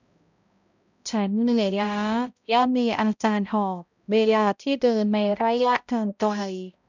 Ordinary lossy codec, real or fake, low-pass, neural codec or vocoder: none; fake; 7.2 kHz; codec, 16 kHz, 0.5 kbps, X-Codec, HuBERT features, trained on balanced general audio